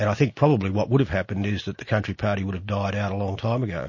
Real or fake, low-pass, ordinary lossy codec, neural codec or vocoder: real; 7.2 kHz; MP3, 32 kbps; none